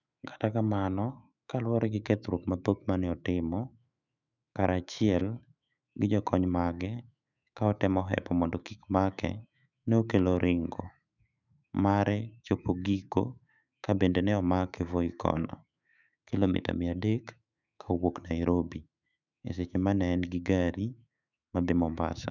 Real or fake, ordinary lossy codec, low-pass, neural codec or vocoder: fake; none; 7.2 kHz; autoencoder, 48 kHz, 128 numbers a frame, DAC-VAE, trained on Japanese speech